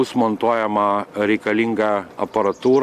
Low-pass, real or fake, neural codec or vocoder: 14.4 kHz; real; none